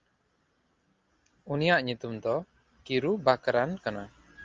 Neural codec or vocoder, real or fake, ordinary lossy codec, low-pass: none; real; Opus, 32 kbps; 7.2 kHz